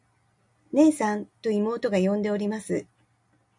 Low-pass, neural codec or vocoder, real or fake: 10.8 kHz; none; real